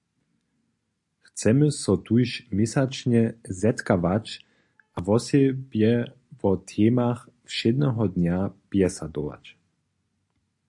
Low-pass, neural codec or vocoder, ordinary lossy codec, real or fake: 10.8 kHz; none; AAC, 64 kbps; real